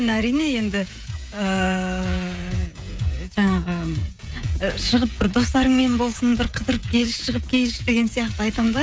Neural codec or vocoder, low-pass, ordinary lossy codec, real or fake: codec, 16 kHz, 16 kbps, FreqCodec, smaller model; none; none; fake